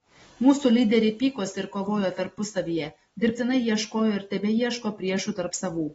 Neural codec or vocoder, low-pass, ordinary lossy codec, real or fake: none; 19.8 kHz; AAC, 24 kbps; real